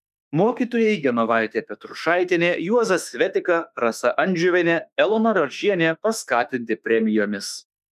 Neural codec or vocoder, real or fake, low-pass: autoencoder, 48 kHz, 32 numbers a frame, DAC-VAE, trained on Japanese speech; fake; 14.4 kHz